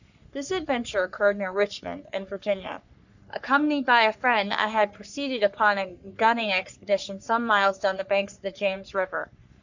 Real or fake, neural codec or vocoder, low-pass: fake; codec, 44.1 kHz, 3.4 kbps, Pupu-Codec; 7.2 kHz